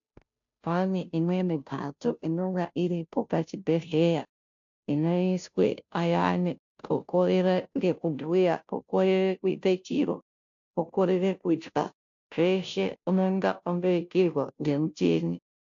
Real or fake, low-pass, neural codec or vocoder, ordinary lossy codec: fake; 7.2 kHz; codec, 16 kHz, 0.5 kbps, FunCodec, trained on Chinese and English, 25 frames a second; AAC, 64 kbps